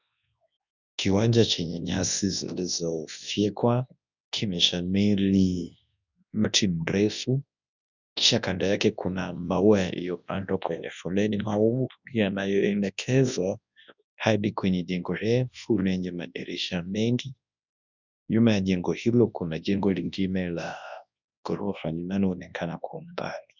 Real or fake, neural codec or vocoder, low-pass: fake; codec, 24 kHz, 0.9 kbps, WavTokenizer, large speech release; 7.2 kHz